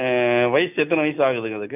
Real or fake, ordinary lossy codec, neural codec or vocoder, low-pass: real; none; none; 3.6 kHz